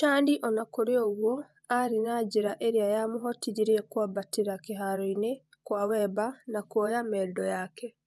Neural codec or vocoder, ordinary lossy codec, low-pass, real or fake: vocoder, 24 kHz, 100 mel bands, Vocos; none; none; fake